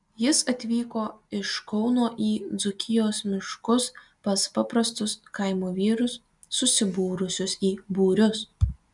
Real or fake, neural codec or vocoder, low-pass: real; none; 10.8 kHz